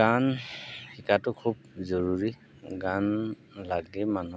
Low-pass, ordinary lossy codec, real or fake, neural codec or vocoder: none; none; real; none